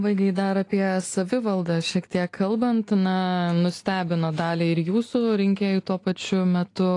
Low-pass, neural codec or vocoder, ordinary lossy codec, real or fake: 10.8 kHz; none; AAC, 48 kbps; real